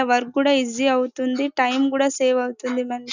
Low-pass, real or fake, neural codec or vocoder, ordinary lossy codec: 7.2 kHz; real; none; none